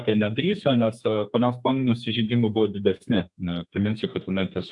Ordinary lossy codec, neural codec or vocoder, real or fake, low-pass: AAC, 64 kbps; codec, 32 kHz, 1.9 kbps, SNAC; fake; 10.8 kHz